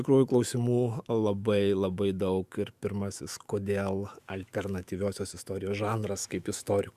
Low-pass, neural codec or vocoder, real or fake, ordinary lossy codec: 14.4 kHz; autoencoder, 48 kHz, 128 numbers a frame, DAC-VAE, trained on Japanese speech; fake; Opus, 64 kbps